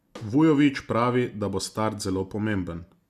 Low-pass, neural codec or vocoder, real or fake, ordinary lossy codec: 14.4 kHz; none; real; Opus, 64 kbps